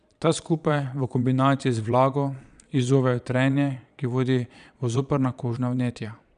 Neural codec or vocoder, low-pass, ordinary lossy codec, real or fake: vocoder, 22.05 kHz, 80 mel bands, Vocos; 9.9 kHz; none; fake